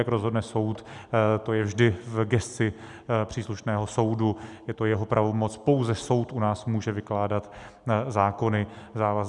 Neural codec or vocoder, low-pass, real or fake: none; 10.8 kHz; real